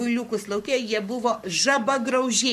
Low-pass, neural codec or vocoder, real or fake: 14.4 kHz; codec, 44.1 kHz, 7.8 kbps, Pupu-Codec; fake